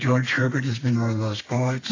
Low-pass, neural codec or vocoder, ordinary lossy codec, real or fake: 7.2 kHz; codec, 32 kHz, 1.9 kbps, SNAC; AAC, 32 kbps; fake